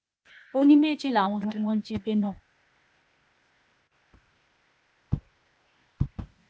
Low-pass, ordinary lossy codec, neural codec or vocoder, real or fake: none; none; codec, 16 kHz, 0.8 kbps, ZipCodec; fake